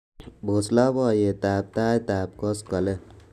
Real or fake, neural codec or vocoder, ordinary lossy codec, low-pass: real; none; none; 14.4 kHz